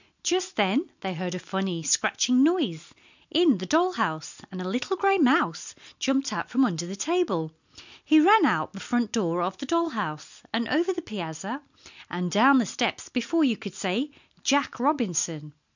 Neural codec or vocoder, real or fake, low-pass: none; real; 7.2 kHz